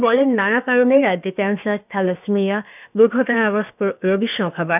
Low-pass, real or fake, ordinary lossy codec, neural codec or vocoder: 3.6 kHz; fake; none; codec, 16 kHz, about 1 kbps, DyCAST, with the encoder's durations